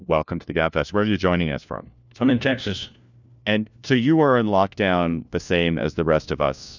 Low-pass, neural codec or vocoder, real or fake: 7.2 kHz; codec, 16 kHz, 1 kbps, FunCodec, trained on LibriTTS, 50 frames a second; fake